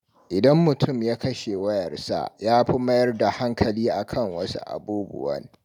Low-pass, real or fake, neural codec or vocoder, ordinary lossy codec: 19.8 kHz; real; none; none